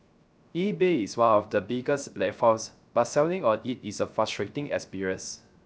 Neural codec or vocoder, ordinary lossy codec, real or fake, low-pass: codec, 16 kHz, 0.3 kbps, FocalCodec; none; fake; none